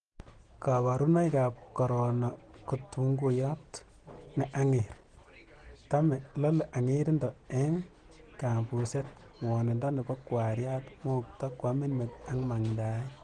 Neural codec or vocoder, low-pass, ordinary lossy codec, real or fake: none; 9.9 kHz; Opus, 16 kbps; real